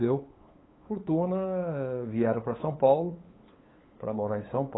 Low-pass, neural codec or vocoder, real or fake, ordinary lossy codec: 7.2 kHz; codec, 16 kHz, 8 kbps, FunCodec, trained on LibriTTS, 25 frames a second; fake; AAC, 16 kbps